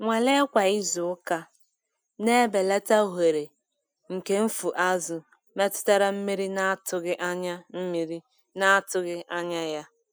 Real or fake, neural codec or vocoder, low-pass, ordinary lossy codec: real; none; none; none